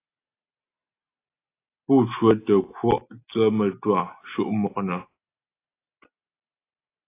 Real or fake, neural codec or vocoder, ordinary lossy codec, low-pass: real; none; AAC, 24 kbps; 3.6 kHz